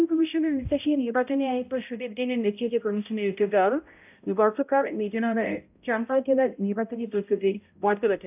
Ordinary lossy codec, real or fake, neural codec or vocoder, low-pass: AAC, 32 kbps; fake; codec, 16 kHz, 0.5 kbps, X-Codec, HuBERT features, trained on balanced general audio; 3.6 kHz